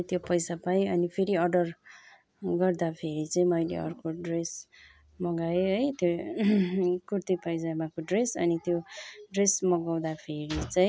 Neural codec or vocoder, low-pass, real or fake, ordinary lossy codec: none; none; real; none